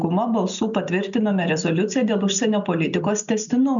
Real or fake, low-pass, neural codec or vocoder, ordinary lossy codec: real; 7.2 kHz; none; MP3, 96 kbps